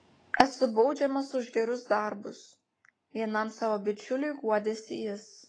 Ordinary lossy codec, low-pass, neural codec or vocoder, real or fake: AAC, 32 kbps; 9.9 kHz; codec, 44.1 kHz, 7.8 kbps, Pupu-Codec; fake